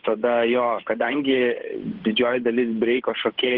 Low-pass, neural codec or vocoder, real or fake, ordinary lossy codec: 5.4 kHz; codec, 16 kHz, 8 kbps, FunCodec, trained on Chinese and English, 25 frames a second; fake; Opus, 16 kbps